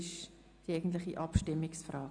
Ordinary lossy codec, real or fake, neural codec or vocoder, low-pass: none; real; none; 9.9 kHz